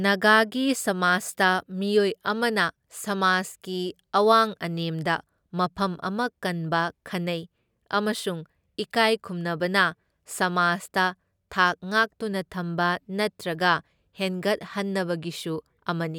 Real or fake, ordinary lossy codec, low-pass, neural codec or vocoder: real; none; none; none